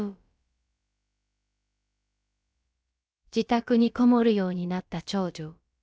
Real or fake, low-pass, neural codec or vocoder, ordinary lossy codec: fake; none; codec, 16 kHz, about 1 kbps, DyCAST, with the encoder's durations; none